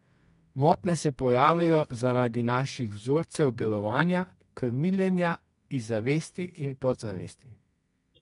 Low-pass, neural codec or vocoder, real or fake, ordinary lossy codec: 10.8 kHz; codec, 24 kHz, 0.9 kbps, WavTokenizer, medium music audio release; fake; MP3, 64 kbps